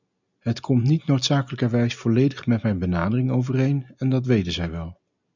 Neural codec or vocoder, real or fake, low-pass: none; real; 7.2 kHz